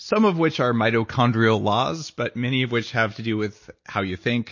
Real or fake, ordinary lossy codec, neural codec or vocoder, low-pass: real; MP3, 32 kbps; none; 7.2 kHz